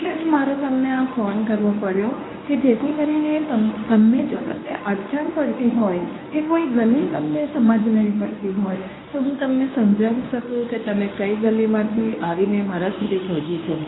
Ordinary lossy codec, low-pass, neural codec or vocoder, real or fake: AAC, 16 kbps; 7.2 kHz; codec, 24 kHz, 0.9 kbps, WavTokenizer, medium speech release version 1; fake